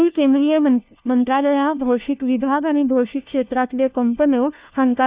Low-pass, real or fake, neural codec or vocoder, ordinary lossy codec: 3.6 kHz; fake; codec, 16 kHz, 1 kbps, FunCodec, trained on LibriTTS, 50 frames a second; Opus, 64 kbps